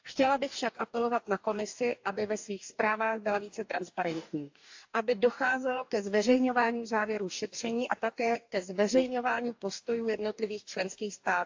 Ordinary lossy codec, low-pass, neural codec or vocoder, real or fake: AAC, 48 kbps; 7.2 kHz; codec, 44.1 kHz, 2.6 kbps, DAC; fake